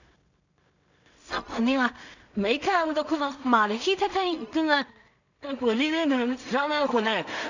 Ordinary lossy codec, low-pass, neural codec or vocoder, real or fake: none; 7.2 kHz; codec, 16 kHz in and 24 kHz out, 0.4 kbps, LongCat-Audio-Codec, two codebook decoder; fake